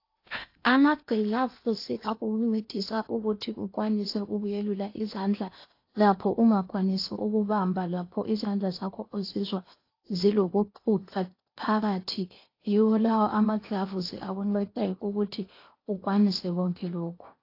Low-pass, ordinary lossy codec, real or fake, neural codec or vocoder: 5.4 kHz; AAC, 32 kbps; fake; codec, 16 kHz in and 24 kHz out, 0.8 kbps, FocalCodec, streaming, 65536 codes